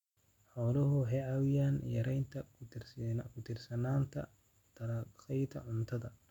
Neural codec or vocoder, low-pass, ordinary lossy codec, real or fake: none; 19.8 kHz; none; real